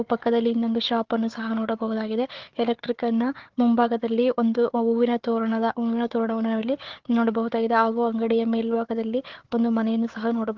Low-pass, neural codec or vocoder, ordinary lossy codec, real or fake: 7.2 kHz; codec, 16 kHz, 4 kbps, FunCodec, trained on Chinese and English, 50 frames a second; Opus, 16 kbps; fake